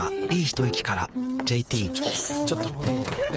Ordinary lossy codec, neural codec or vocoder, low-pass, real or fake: none; codec, 16 kHz, 8 kbps, FreqCodec, smaller model; none; fake